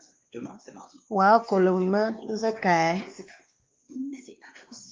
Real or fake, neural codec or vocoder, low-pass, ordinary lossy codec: fake; codec, 16 kHz, 2 kbps, X-Codec, WavLM features, trained on Multilingual LibriSpeech; 7.2 kHz; Opus, 24 kbps